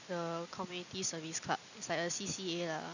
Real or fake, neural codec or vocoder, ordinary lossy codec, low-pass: real; none; none; 7.2 kHz